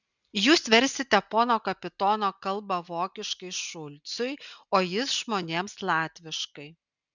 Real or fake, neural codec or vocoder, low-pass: real; none; 7.2 kHz